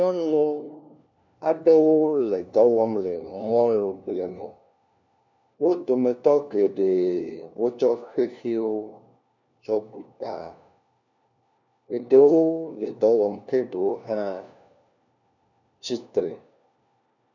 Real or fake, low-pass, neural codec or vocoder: fake; 7.2 kHz; codec, 16 kHz, 1 kbps, FunCodec, trained on LibriTTS, 50 frames a second